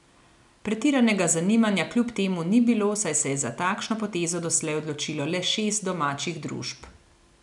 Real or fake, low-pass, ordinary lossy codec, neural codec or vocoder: real; 10.8 kHz; none; none